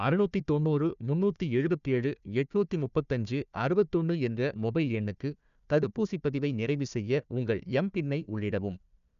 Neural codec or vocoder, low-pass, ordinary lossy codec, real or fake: codec, 16 kHz, 1 kbps, FunCodec, trained on Chinese and English, 50 frames a second; 7.2 kHz; none; fake